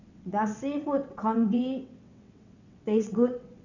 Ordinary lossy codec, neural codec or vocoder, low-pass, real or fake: none; vocoder, 22.05 kHz, 80 mel bands, WaveNeXt; 7.2 kHz; fake